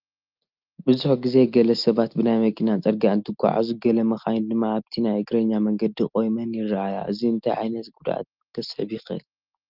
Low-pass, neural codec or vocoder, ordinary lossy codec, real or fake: 5.4 kHz; none; Opus, 24 kbps; real